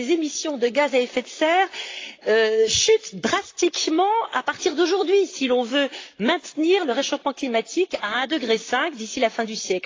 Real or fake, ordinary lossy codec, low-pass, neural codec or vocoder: fake; AAC, 32 kbps; 7.2 kHz; vocoder, 44.1 kHz, 128 mel bands, Pupu-Vocoder